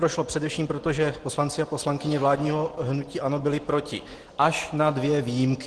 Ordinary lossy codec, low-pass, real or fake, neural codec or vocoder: Opus, 16 kbps; 10.8 kHz; real; none